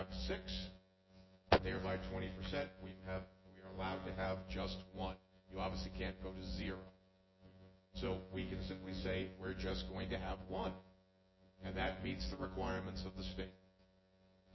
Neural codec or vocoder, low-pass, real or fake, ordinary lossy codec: vocoder, 24 kHz, 100 mel bands, Vocos; 7.2 kHz; fake; MP3, 24 kbps